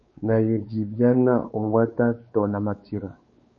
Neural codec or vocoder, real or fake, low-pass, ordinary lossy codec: codec, 16 kHz, 4 kbps, X-Codec, WavLM features, trained on Multilingual LibriSpeech; fake; 7.2 kHz; MP3, 32 kbps